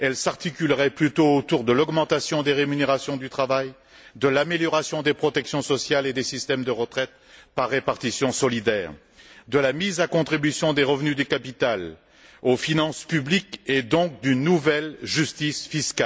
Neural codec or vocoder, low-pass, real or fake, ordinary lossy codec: none; none; real; none